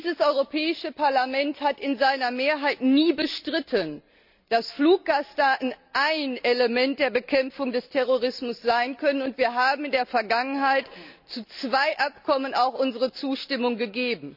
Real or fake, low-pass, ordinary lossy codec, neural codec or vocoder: real; 5.4 kHz; none; none